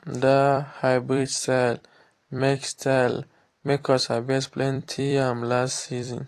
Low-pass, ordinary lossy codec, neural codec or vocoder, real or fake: 14.4 kHz; AAC, 48 kbps; vocoder, 44.1 kHz, 128 mel bands every 256 samples, BigVGAN v2; fake